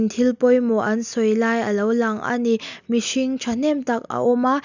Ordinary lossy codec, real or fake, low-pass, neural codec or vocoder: none; real; 7.2 kHz; none